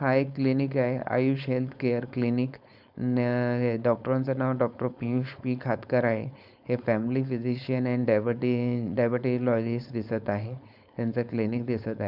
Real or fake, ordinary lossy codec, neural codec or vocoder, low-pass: fake; none; codec, 16 kHz, 4.8 kbps, FACodec; 5.4 kHz